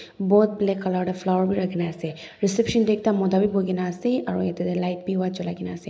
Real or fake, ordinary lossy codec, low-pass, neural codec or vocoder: real; none; none; none